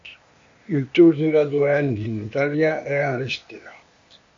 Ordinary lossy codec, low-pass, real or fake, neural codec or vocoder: MP3, 48 kbps; 7.2 kHz; fake; codec, 16 kHz, 0.8 kbps, ZipCodec